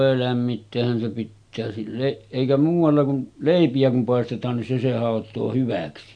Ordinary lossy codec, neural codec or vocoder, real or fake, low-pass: none; none; real; 9.9 kHz